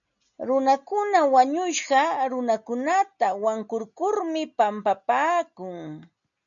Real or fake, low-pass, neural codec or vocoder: real; 7.2 kHz; none